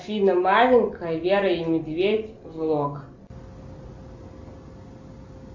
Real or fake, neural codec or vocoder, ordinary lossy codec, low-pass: real; none; MP3, 64 kbps; 7.2 kHz